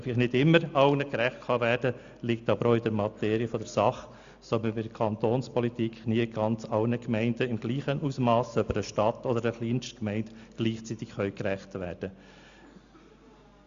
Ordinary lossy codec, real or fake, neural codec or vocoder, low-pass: Opus, 64 kbps; real; none; 7.2 kHz